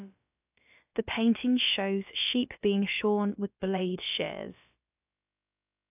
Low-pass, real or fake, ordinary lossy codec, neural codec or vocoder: 3.6 kHz; fake; AAC, 32 kbps; codec, 16 kHz, about 1 kbps, DyCAST, with the encoder's durations